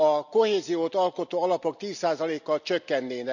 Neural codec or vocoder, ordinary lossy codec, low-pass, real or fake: none; none; 7.2 kHz; real